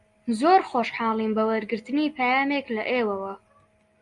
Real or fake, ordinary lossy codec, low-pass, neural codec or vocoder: real; Opus, 64 kbps; 10.8 kHz; none